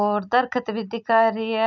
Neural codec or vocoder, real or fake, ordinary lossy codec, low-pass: none; real; none; 7.2 kHz